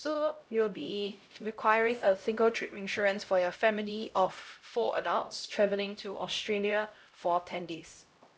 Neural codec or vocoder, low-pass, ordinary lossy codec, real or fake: codec, 16 kHz, 0.5 kbps, X-Codec, HuBERT features, trained on LibriSpeech; none; none; fake